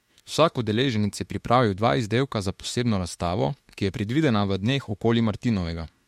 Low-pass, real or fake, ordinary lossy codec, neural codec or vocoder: 19.8 kHz; fake; MP3, 64 kbps; autoencoder, 48 kHz, 32 numbers a frame, DAC-VAE, trained on Japanese speech